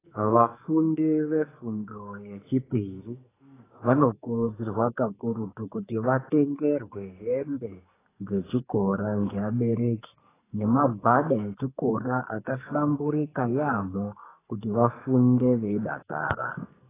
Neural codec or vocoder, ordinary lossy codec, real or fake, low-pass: codec, 44.1 kHz, 2.6 kbps, SNAC; AAC, 16 kbps; fake; 3.6 kHz